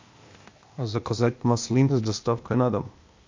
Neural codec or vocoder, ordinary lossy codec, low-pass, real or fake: codec, 16 kHz, 0.8 kbps, ZipCodec; MP3, 48 kbps; 7.2 kHz; fake